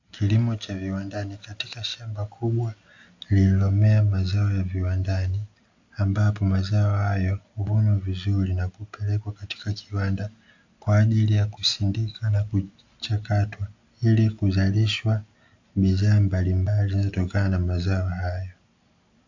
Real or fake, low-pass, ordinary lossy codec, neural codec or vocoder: real; 7.2 kHz; AAC, 48 kbps; none